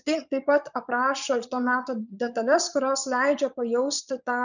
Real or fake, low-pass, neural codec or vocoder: fake; 7.2 kHz; vocoder, 22.05 kHz, 80 mel bands, Vocos